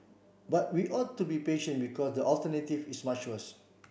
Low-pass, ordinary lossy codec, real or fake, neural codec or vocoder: none; none; real; none